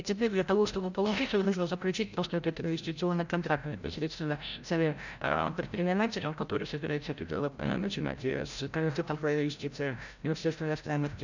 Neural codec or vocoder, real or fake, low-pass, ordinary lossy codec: codec, 16 kHz, 0.5 kbps, FreqCodec, larger model; fake; 7.2 kHz; none